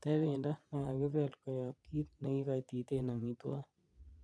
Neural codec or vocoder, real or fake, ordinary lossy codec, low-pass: vocoder, 22.05 kHz, 80 mel bands, Vocos; fake; none; none